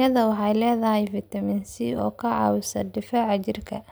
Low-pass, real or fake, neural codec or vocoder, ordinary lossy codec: none; real; none; none